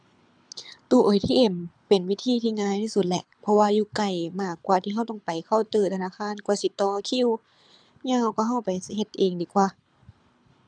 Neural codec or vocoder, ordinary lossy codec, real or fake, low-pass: codec, 24 kHz, 6 kbps, HILCodec; none; fake; 9.9 kHz